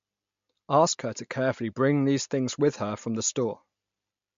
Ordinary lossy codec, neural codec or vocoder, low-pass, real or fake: MP3, 48 kbps; none; 7.2 kHz; real